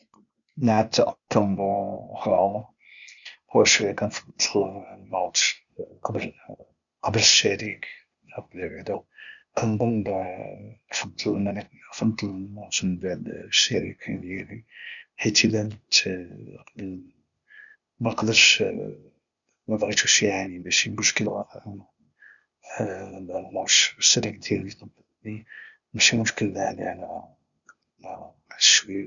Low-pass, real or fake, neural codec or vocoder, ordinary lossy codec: 7.2 kHz; fake; codec, 16 kHz, 0.8 kbps, ZipCodec; none